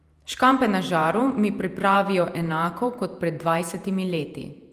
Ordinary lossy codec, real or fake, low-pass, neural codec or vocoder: Opus, 32 kbps; fake; 14.4 kHz; vocoder, 48 kHz, 128 mel bands, Vocos